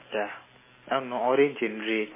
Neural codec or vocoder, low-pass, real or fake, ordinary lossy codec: none; 3.6 kHz; real; MP3, 16 kbps